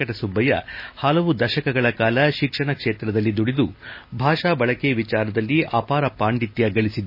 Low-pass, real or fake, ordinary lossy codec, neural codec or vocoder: 5.4 kHz; real; MP3, 24 kbps; none